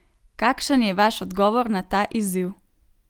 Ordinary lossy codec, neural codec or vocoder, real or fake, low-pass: Opus, 24 kbps; none; real; 19.8 kHz